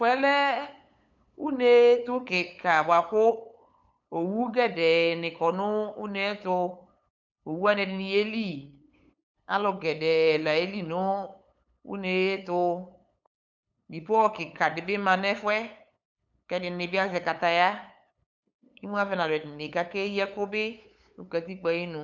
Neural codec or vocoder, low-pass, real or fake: codec, 16 kHz, 8 kbps, FunCodec, trained on LibriTTS, 25 frames a second; 7.2 kHz; fake